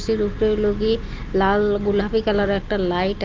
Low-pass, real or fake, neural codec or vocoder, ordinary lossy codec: 7.2 kHz; real; none; Opus, 24 kbps